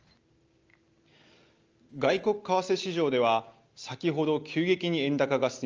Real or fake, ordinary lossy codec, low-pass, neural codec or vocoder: real; Opus, 24 kbps; 7.2 kHz; none